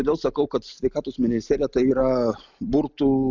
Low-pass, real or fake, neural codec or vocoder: 7.2 kHz; real; none